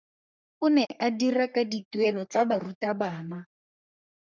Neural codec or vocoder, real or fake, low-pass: codec, 44.1 kHz, 3.4 kbps, Pupu-Codec; fake; 7.2 kHz